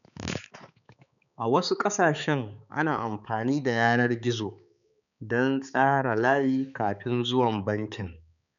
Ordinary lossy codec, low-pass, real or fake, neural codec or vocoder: none; 7.2 kHz; fake; codec, 16 kHz, 4 kbps, X-Codec, HuBERT features, trained on balanced general audio